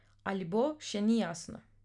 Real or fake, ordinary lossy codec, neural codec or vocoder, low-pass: real; none; none; 10.8 kHz